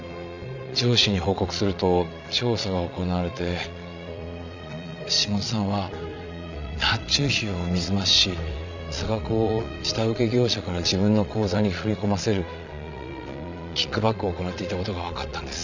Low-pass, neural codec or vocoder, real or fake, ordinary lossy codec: 7.2 kHz; vocoder, 22.05 kHz, 80 mel bands, Vocos; fake; none